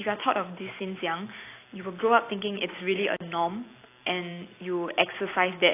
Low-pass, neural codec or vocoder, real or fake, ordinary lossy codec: 3.6 kHz; vocoder, 44.1 kHz, 128 mel bands every 256 samples, BigVGAN v2; fake; AAC, 24 kbps